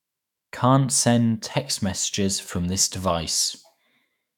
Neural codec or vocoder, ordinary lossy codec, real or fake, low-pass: autoencoder, 48 kHz, 128 numbers a frame, DAC-VAE, trained on Japanese speech; none; fake; 19.8 kHz